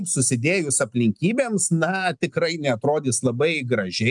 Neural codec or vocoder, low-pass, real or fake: none; 10.8 kHz; real